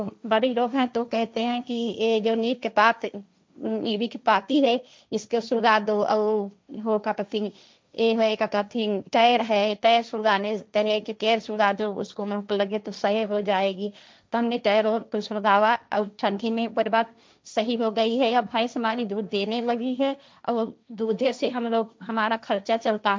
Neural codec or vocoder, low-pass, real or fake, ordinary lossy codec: codec, 16 kHz, 1.1 kbps, Voila-Tokenizer; none; fake; none